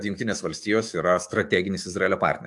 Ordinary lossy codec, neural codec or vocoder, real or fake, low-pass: AAC, 64 kbps; autoencoder, 48 kHz, 128 numbers a frame, DAC-VAE, trained on Japanese speech; fake; 10.8 kHz